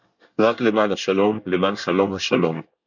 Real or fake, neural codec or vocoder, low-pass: fake; codec, 24 kHz, 1 kbps, SNAC; 7.2 kHz